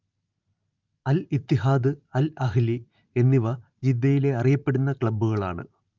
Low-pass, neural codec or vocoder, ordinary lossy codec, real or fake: 7.2 kHz; vocoder, 44.1 kHz, 128 mel bands every 512 samples, BigVGAN v2; Opus, 24 kbps; fake